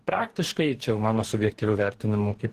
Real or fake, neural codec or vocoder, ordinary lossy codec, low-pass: fake; codec, 44.1 kHz, 2.6 kbps, DAC; Opus, 16 kbps; 14.4 kHz